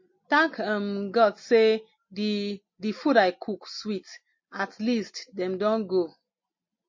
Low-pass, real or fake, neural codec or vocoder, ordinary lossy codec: 7.2 kHz; real; none; MP3, 32 kbps